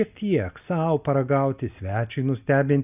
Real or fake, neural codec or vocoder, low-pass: real; none; 3.6 kHz